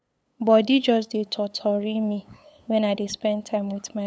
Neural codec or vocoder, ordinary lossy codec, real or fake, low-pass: codec, 16 kHz, 8 kbps, FunCodec, trained on LibriTTS, 25 frames a second; none; fake; none